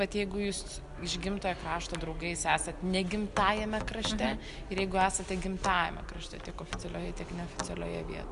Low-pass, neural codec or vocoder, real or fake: 10.8 kHz; none; real